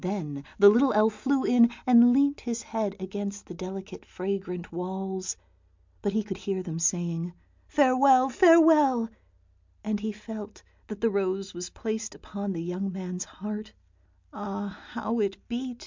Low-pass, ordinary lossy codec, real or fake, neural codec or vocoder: 7.2 kHz; MP3, 64 kbps; real; none